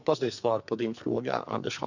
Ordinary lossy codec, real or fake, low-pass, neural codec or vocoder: none; fake; 7.2 kHz; codec, 44.1 kHz, 2.6 kbps, SNAC